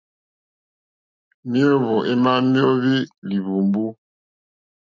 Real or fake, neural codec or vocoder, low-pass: real; none; 7.2 kHz